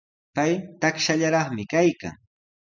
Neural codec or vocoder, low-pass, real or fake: none; 7.2 kHz; real